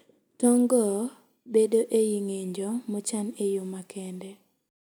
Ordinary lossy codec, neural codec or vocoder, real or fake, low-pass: none; none; real; none